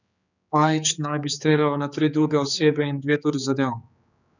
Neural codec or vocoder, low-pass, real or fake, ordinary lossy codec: codec, 16 kHz, 4 kbps, X-Codec, HuBERT features, trained on general audio; 7.2 kHz; fake; none